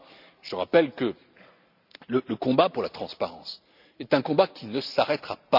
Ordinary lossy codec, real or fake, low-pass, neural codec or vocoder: none; real; 5.4 kHz; none